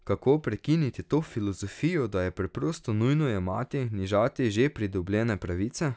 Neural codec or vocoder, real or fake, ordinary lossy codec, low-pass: none; real; none; none